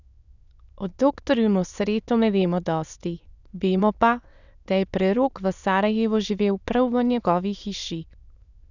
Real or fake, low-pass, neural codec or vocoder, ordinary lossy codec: fake; 7.2 kHz; autoencoder, 22.05 kHz, a latent of 192 numbers a frame, VITS, trained on many speakers; none